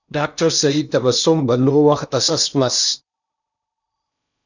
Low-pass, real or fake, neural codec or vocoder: 7.2 kHz; fake; codec, 16 kHz in and 24 kHz out, 0.8 kbps, FocalCodec, streaming, 65536 codes